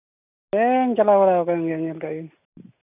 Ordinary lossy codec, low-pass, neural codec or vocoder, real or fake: none; 3.6 kHz; none; real